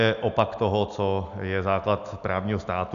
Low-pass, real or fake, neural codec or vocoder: 7.2 kHz; real; none